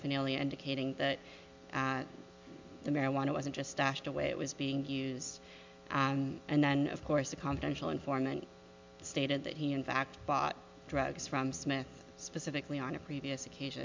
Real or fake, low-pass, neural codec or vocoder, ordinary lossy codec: real; 7.2 kHz; none; MP3, 64 kbps